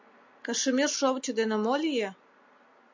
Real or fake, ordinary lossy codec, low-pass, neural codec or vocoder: real; MP3, 64 kbps; 7.2 kHz; none